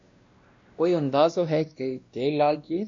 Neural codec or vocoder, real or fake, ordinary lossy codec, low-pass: codec, 16 kHz, 1 kbps, X-Codec, WavLM features, trained on Multilingual LibriSpeech; fake; MP3, 48 kbps; 7.2 kHz